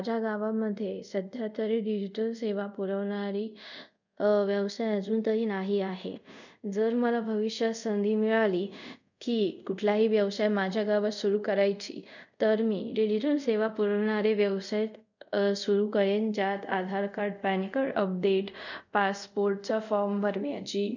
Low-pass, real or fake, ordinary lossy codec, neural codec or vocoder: 7.2 kHz; fake; none; codec, 24 kHz, 0.5 kbps, DualCodec